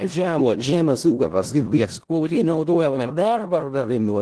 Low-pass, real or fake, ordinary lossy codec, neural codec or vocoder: 10.8 kHz; fake; Opus, 16 kbps; codec, 16 kHz in and 24 kHz out, 0.4 kbps, LongCat-Audio-Codec, four codebook decoder